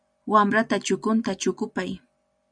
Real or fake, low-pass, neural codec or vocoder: real; 9.9 kHz; none